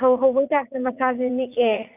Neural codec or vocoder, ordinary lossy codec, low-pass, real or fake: vocoder, 44.1 kHz, 80 mel bands, Vocos; none; 3.6 kHz; fake